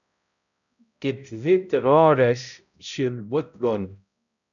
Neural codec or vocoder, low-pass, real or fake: codec, 16 kHz, 0.5 kbps, X-Codec, HuBERT features, trained on balanced general audio; 7.2 kHz; fake